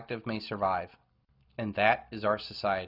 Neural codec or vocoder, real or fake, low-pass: none; real; 5.4 kHz